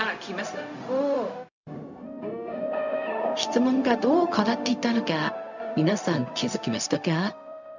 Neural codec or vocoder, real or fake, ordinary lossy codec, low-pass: codec, 16 kHz, 0.4 kbps, LongCat-Audio-Codec; fake; none; 7.2 kHz